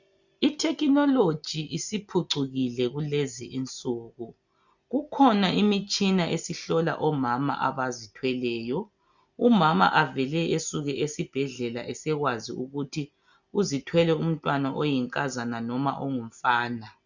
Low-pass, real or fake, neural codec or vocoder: 7.2 kHz; real; none